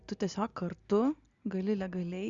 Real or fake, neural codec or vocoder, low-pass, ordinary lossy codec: real; none; 7.2 kHz; Opus, 64 kbps